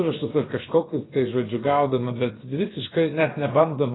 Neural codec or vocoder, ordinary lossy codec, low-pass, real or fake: codec, 16 kHz, about 1 kbps, DyCAST, with the encoder's durations; AAC, 16 kbps; 7.2 kHz; fake